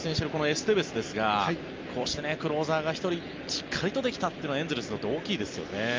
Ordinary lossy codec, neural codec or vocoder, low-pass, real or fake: Opus, 24 kbps; none; 7.2 kHz; real